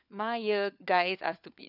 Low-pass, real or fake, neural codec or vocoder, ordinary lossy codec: 5.4 kHz; fake; codec, 16 kHz, 4 kbps, FunCodec, trained on LibriTTS, 50 frames a second; none